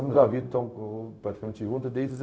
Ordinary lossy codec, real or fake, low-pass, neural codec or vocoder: none; fake; none; codec, 16 kHz, 0.4 kbps, LongCat-Audio-Codec